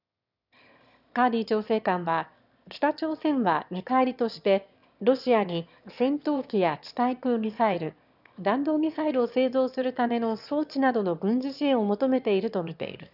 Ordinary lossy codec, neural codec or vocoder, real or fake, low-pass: none; autoencoder, 22.05 kHz, a latent of 192 numbers a frame, VITS, trained on one speaker; fake; 5.4 kHz